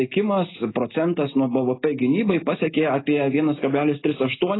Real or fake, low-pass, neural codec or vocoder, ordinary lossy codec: real; 7.2 kHz; none; AAC, 16 kbps